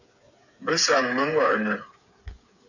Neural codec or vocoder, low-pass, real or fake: codec, 16 kHz, 8 kbps, FreqCodec, smaller model; 7.2 kHz; fake